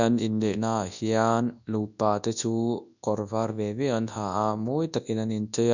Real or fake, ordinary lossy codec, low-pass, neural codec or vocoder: fake; none; 7.2 kHz; codec, 24 kHz, 0.9 kbps, WavTokenizer, large speech release